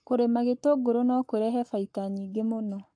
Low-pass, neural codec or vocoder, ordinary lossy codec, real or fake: 9.9 kHz; codec, 44.1 kHz, 7.8 kbps, Pupu-Codec; AAC, 64 kbps; fake